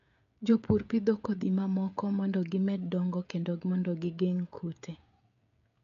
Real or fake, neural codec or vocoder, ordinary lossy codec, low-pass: fake; codec, 16 kHz, 16 kbps, FreqCodec, smaller model; MP3, 64 kbps; 7.2 kHz